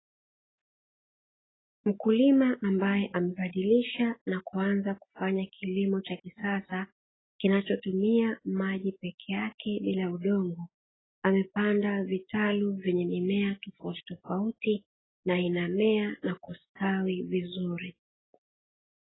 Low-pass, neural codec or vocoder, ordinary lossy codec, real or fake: 7.2 kHz; none; AAC, 16 kbps; real